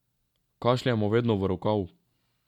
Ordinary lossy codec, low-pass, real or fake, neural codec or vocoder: none; 19.8 kHz; real; none